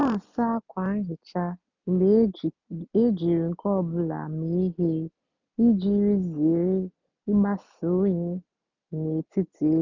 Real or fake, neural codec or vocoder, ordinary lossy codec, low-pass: real; none; none; 7.2 kHz